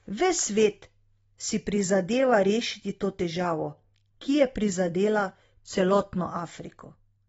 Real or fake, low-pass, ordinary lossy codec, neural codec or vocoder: real; 19.8 kHz; AAC, 24 kbps; none